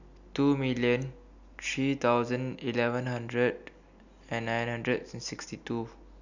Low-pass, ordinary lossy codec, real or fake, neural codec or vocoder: 7.2 kHz; none; real; none